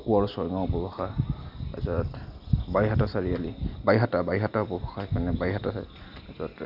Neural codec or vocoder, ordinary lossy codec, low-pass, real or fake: none; none; 5.4 kHz; real